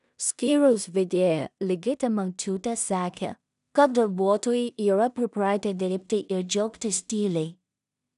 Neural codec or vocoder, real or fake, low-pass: codec, 16 kHz in and 24 kHz out, 0.4 kbps, LongCat-Audio-Codec, two codebook decoder; fake; 10.8 kHz